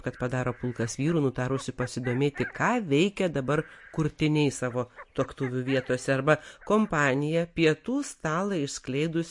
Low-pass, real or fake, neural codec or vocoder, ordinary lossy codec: 10.8 kHz; real; none; MP3, 48 kbps